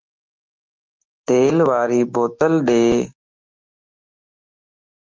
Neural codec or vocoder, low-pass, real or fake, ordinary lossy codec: codec, 16 kHz, 6 kbps, DAC; 7.2 kHz; fake; Opus, 24 kbps